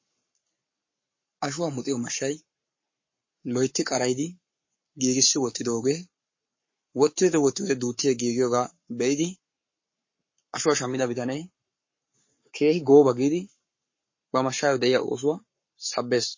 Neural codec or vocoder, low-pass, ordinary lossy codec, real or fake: codec, 44.1 kHz, 7.8 kbps, Pupu-Codec; 7.2 kHz; MP3, 32 kbps; fake